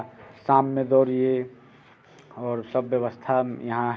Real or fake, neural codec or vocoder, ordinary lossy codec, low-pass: real; none; none; none